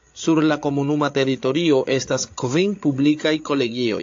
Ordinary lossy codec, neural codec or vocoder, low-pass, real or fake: AAC, 48 kbps; codec, 16 kHz, 8 kbps, FreqCodec, larger model; 7.2 kHz; fake